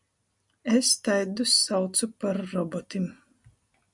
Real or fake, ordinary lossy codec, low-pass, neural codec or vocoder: real; MP3, 96 kbps; 10.8 kHz; none